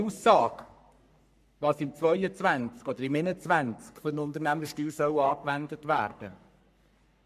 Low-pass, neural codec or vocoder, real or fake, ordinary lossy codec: 14.4 kHz; codec, 44.1 kHz, 3.4 kbps, Pupu-Codec; fake; none